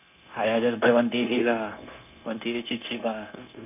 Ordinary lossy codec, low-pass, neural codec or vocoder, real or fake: none; 3.6 kHz; codec, 16 kHz, 0.9 kbps, LongCat-Audio-Codec; fake